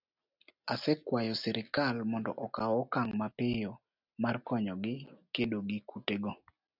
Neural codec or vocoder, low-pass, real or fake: none; 5.4 kHz; real